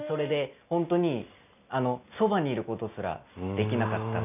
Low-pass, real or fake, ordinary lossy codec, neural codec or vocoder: 3.6 kHz; real; none; none